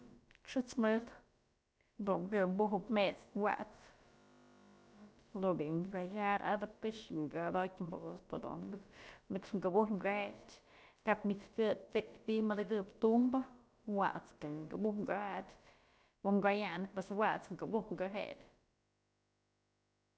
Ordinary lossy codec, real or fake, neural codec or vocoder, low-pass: none; fake; codec, 16 kHz, about 1 kbps, DyCAST, with the encoder's durations; none